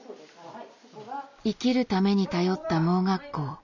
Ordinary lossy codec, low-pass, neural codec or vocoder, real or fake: none; 7.2 kHz; none; real